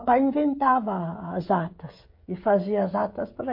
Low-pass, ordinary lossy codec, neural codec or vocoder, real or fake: 5.4 kHz; MP3, 32 kbps; codec, 16 kHz, 16 kbps, FreqCodec, smaller model; fake